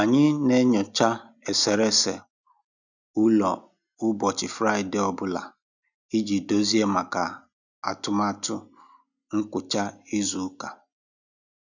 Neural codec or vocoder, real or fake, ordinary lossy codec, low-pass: none; real; none; 7.2 kHz